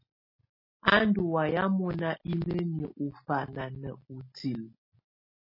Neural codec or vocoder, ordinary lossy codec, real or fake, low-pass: none; MP3, 24 kbps; real; 5.4 kHz